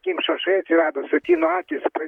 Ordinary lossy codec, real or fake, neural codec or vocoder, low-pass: MP3, 96 kbps; fake; vocoder, 44.1 kHz, 128 mel bands, Pupu-Vocoder; 19.8 kHz